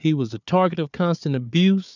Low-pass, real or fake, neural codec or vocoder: 7.2 kHz; fake; codec, 16 kHz, 4 kbps, FunCodec, trained on Chinese and English, 50 frames a second